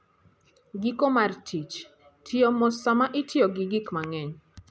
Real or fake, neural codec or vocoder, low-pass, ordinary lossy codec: real; none; none; none